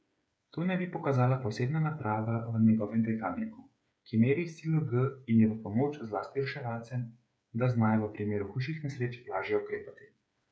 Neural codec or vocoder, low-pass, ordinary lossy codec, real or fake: codec, 16 kHz, 8 kbps, FreqCodec, smaller model; none; none; fake